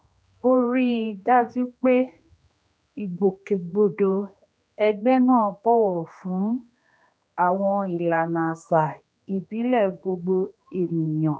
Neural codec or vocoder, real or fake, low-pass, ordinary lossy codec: codec, 16 kHz, 2 kbps, X-Codec, HuBERT features, trained on general audio; fake; none; none